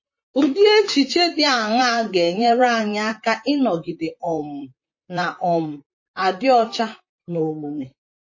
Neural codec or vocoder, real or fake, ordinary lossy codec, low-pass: vocoder, 44.1 kHz, 128 mel bands, Pupu-Vocoder; fake; MP3, 32 kbps; 7.2 kHz